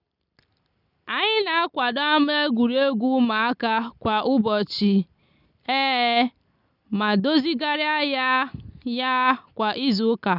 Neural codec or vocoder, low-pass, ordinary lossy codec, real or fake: none; 5.4 kHz; none; real